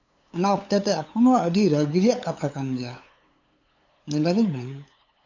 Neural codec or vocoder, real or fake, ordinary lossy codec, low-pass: codec, 16 kHz, 8 kbps, FunCodec, trained on LibriTTS, 25 frames a second; fake; AAC, 48 kbps; 7.2 kHz